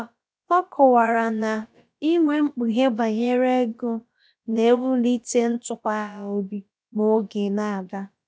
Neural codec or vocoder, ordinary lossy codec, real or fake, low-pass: codec, 16 kHz, about 1 kbps, DyCAST, with the encoder's durations; none; fake; none